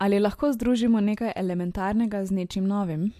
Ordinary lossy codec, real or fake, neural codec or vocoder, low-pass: MP3, 64 kbps; real; none; 14.4 kHz